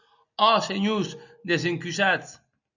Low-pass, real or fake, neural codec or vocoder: 7.2 kHz; real; none